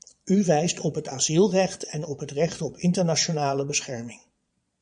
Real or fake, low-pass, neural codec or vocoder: fake; 9.9 kHz; vocoder, 22.05 kHz, 80 mel bands, Vocos